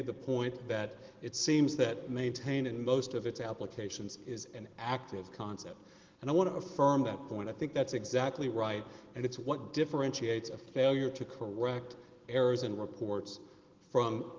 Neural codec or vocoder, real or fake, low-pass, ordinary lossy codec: none; real; 7.2 kHz; Opus, 16 kbps